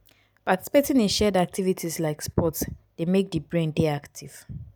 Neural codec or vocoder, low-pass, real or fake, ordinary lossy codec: none; none; real; none